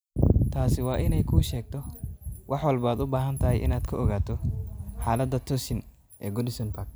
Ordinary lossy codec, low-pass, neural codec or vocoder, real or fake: none; none; none; real